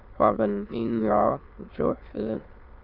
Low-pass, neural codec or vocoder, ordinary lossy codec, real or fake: 5.4 kHz; autoencoder, 22.05 kHz, a latent of 192 numbers a frame, VITS, trained on many speakers; Opus, 32 kbps; fake